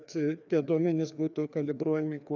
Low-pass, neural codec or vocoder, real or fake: 7.2 kHz; codec, 16 kHz, 2 kbps, FreqCodec, larger model; fake